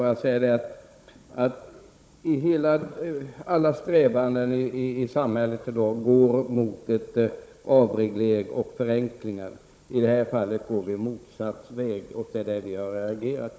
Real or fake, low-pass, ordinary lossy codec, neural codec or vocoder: fake; none; none; codec, 16 kHz, 16 kbps, FunCodec, trained on Chinese and English, 50 frames a second